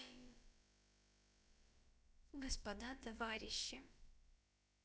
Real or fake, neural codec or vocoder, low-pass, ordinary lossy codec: fake; codec, 16 kHz, about 1 kbps, DyCAST, with the encoder's durations; none; none